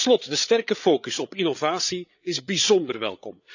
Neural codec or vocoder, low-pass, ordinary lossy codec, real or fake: codec, 16 kHz, 8 kbps, FreqCodec, larger model; 7.2 kHz; none; fake